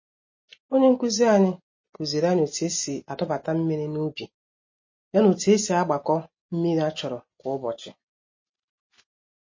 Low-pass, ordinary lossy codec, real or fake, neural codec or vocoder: 7.2 kHz; MP3, 32 kbps; real; none